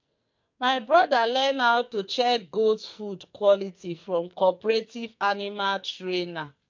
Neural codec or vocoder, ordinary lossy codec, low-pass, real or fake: codec, 44.1 kHz, 2.6 kbps, SNAC; MP3, 48 kbps; 7.2 kHz; fake